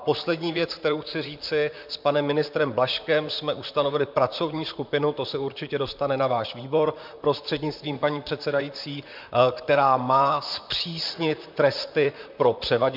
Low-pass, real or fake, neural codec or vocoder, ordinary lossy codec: 5.4 kHz; fake; vocoder, 44.1 kHz, 128 mel bands, Pupu-Vocoder; MP3, 48 kbps